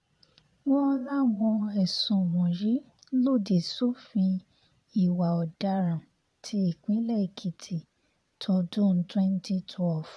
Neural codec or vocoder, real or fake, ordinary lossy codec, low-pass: vocoder, 22.05 kHz, 80 mel bands, Vocos; fake; none; none